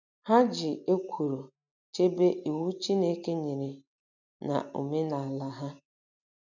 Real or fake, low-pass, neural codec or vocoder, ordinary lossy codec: real; 7.2 kHz; none; none